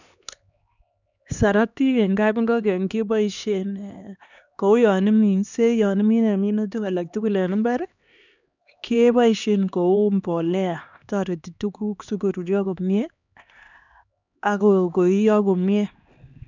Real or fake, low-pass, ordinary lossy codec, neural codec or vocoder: fake; 7.2 kHz; none; codec, 16 kHz, 2 kbps, X-Codec, HuBERT features, trained on LibriSpeech